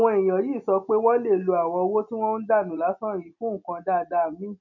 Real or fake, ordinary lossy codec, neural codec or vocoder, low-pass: real; none; none; 7.2 kHz